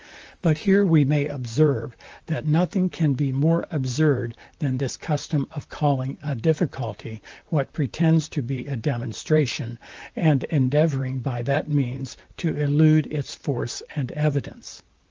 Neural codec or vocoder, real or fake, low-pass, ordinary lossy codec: vocoder, 44.1 kHz, 128 mel bands, Pupu-Vocoder; fake; 7.2 kHz; Opus, 24 kbps